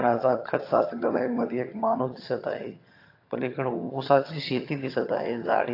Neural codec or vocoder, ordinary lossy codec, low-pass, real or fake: vocoder, 22.05 kHz, 80 mel bands, HiFi-GAN; AAC, 32 kbps; 5.4 kHz; fake